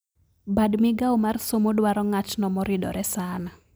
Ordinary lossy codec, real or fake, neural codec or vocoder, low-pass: none; real; none; none